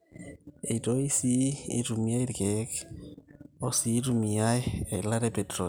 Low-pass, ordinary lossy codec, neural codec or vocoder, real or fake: none; none; none; real